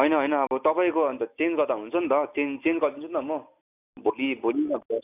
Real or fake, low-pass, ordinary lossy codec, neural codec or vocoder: real; 3.6 kHz; none; none